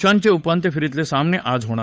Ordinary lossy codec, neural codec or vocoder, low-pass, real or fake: none; codec, 16 kHz, 8 kbps, FunCodec, trained on Chinese and English, 25 frames a second; none; fake